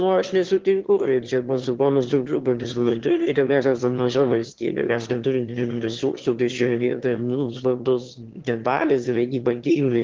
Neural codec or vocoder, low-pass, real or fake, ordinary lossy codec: autoencoder, 22.05 kHz, a latent of 192 numbers a frame, VITS, trained on one speaker; 7.2 kHz; fake; Opus, 16 kbps